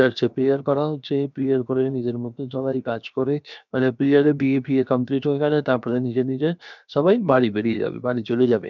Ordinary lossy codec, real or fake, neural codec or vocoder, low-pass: none; fake; codec, 16 kHz, 0.7 kbps, FocalCodec; 7.2 kHz